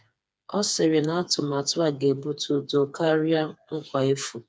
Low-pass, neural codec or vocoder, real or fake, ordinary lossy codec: none; codec, 16 kHz, 4 kbps, FreqCodec, smaller model; fake; none